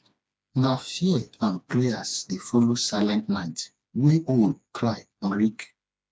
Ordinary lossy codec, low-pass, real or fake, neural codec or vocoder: none; none; fake; codec, 16 kHz, 2 kbps, FreqCodec, smaller model